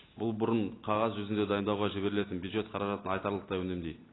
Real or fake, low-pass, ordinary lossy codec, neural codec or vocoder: real; 7.2 kHz; AAC, 16 kbps; none